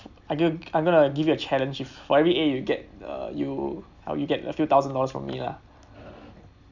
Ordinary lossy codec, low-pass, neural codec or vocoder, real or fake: none; 7.2 kHz; none; real